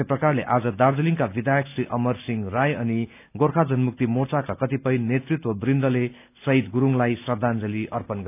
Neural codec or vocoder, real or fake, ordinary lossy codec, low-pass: none; real; none; 3.6 kHz